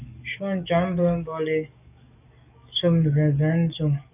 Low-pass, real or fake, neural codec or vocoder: 3.6 kHz; fake; codec, 44.1 kHz, 7.8 kbps, DAC